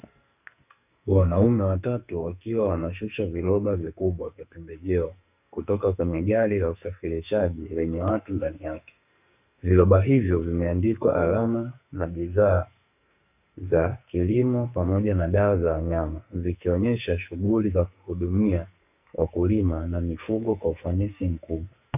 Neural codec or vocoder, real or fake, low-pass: codec, 44.1 kHz, 2.6 kbps, SNAC; fake; 3.6 kHz